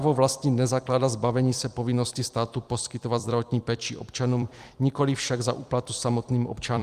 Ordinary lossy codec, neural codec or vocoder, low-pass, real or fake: Opus, 32 kbps; vocoder, 44.1 kHz, 128 mel bands every 256 samples, BigVGAN v2; 14.4 kHz; fake